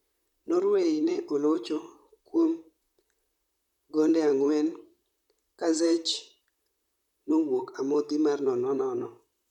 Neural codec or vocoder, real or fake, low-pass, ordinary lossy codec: vocoder, 44.1 kHz, 128 mel bands, Pupu-Vocoder; fake; 19.8 kHz; none